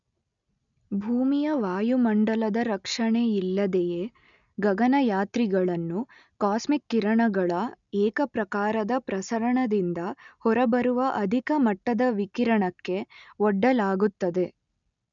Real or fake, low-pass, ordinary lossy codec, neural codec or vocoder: real; 7.2 kHz; none; none